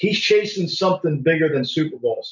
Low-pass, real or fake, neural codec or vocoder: 7.2 kHz; real; none